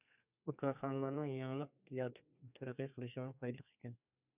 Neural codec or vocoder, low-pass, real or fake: codec, 32 kHz, 1.9 kbps, SNAC; 3.6 kHz; fake